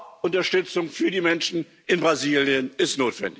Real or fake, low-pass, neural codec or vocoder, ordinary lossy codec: real; none; none; none